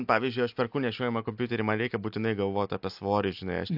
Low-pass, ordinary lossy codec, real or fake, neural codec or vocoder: 5.4 kHz; AAC, 48 kbps; real; none